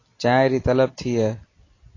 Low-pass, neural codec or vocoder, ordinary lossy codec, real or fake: 7.2 kHz; none; AAC, 32 kbps; real